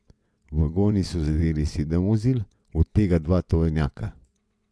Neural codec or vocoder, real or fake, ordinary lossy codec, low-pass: vocoder, 22.05 kHz, 80 mel bands, WaveNeXt; fake; none; none